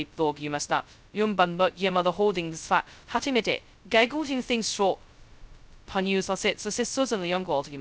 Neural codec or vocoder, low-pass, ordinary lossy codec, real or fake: codec, 16 kHz, 0.2 kbps, FocalCodec; none; none; fake